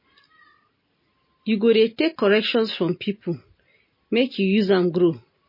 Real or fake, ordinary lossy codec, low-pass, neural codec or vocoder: real; MP3, 24 kbps; 5.4 kHz; none